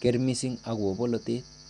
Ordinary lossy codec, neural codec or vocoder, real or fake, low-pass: none; none; real; 10.8 kHz